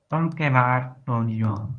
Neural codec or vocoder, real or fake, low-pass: codec, 24 kHz, 0.9 kbps, WavTokenizer, medium speech release version 1; fake; 9.9 kHz